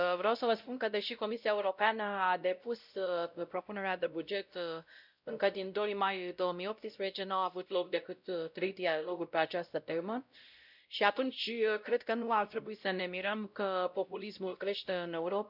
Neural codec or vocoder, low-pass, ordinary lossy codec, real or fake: codec, 16 kHz, 0.5 kbps, X-Codec, WavLM features, trained on Multilingual LibriSpeech; 5.4 kHz; none; fake